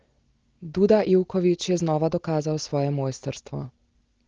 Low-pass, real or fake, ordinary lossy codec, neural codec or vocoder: 7.2 kHz; real; Opus, 16 kbps; none